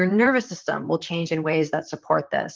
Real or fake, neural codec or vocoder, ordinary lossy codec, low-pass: fake; vocoder, 44.1 kHz, 128 mel bands, Pupu-Vocoder; Opus, 32 kbps; 7.2 kHz